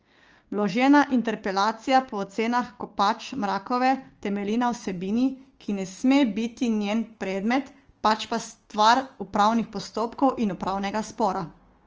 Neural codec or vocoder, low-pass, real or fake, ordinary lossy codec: codec, 16 kHz, 6 kbps, DAC; 7.2 kHz; fake; Opus, 24 kbps